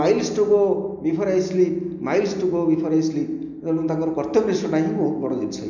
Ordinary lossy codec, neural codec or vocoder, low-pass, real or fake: none; none; 7.2 kHz; real